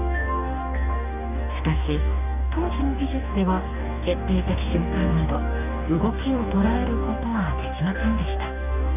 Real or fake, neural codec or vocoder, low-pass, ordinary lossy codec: fake; codec, 44.1 kHz, 2.6 kbps, DAC; 3.6 kHz; none